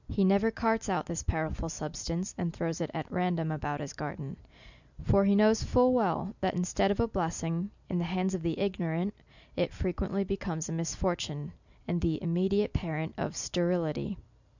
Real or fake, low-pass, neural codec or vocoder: real; 7.2 kHz; none